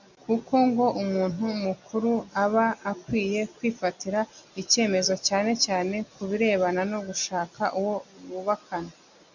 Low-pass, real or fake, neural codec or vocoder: 7.2 kHz; real; none